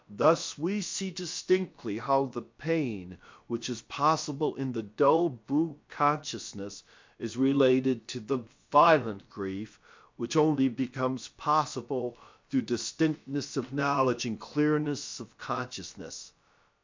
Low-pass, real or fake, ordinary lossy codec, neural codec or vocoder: 7.2 kHz; fake; MP3, 64 kbps; codec, 16 kHz, about 1 kbps, DyCAST, with the encoder's durations